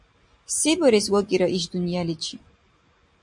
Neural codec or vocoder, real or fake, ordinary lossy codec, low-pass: none; real; MP3, 48 kbps; 10.8 kHz